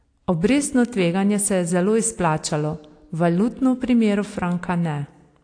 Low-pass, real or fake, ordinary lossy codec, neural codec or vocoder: 9.9 kHz; real; AAC, 48 kbps; none